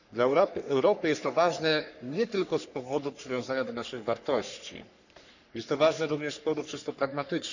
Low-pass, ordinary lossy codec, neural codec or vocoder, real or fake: 7.2 kHz; none; codec, 44.1 kHz, 3.4 kbps, Pupu-Codec; fake